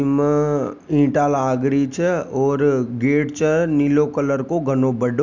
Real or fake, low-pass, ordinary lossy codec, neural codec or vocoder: real; 7.2 kHz; none; none